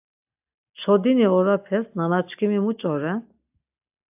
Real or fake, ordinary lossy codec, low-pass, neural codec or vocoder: real; AAC, 32 kbps; 3.6 kHz; none